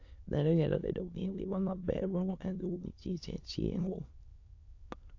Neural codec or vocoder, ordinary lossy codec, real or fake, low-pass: autoencoder, 22.05 kHz, a latent of 192 numbers a frame, VITS, trained on many speakers; AAC, 48 kbps; fake; 7.2 kHz